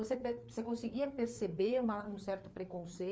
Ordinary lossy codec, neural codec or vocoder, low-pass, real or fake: none; codec, 16 kHz, 4 kbps, FreqCodec, larger model; none; fake